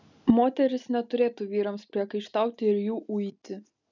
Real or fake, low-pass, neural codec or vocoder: real; 7.2 kHz; none